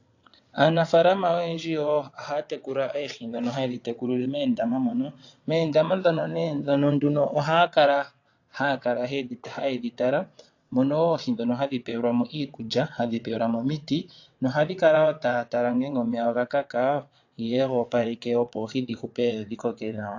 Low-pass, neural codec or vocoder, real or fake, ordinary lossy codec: 7.2 kHz; vocoder, 22.05 kHz, 80 mel bands, WaveNeXt; fake; AAC, 48 kbps